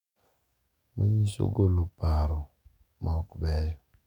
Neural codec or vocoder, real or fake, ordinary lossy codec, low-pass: codec, 44.1 kHz, 7.8 kbps, DAC; fake; none; 19.8 kHz